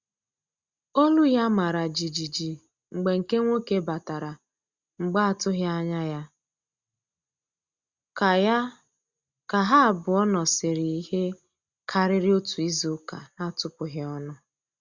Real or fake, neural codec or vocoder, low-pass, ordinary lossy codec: real; none; 7.2 kHz; Opus, 64 kbps